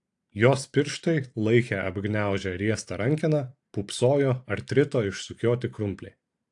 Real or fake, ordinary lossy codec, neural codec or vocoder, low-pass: fake; AAC, 64 kbps; vocoder, 44.1 kHz, 128 mel bands every 512 samples, BigVGAN v2; 10.8 kHz